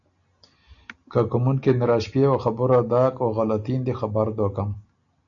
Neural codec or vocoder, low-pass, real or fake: none; 7.2 kHz; real